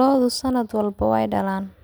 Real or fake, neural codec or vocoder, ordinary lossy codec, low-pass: real; none; none; none